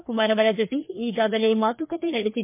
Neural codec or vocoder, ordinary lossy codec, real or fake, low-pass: codec, 16 kHz, 2 kbps, FreqCodec, larger model; MP3, 32 kbps; fake; 3.6 kHz